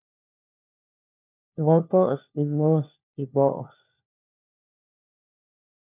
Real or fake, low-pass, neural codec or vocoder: fake; 3.6 kHz; codec, 16 kHz, 1 kbps, FunCodec, trained on LibriTTS, 50 frames a second